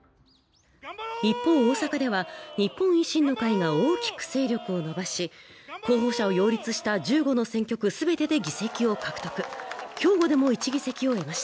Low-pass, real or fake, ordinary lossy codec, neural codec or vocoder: none; real; none; none